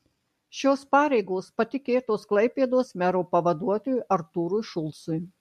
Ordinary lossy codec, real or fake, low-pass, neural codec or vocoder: MP3, 64 kbps; real; 14.4 kHz; none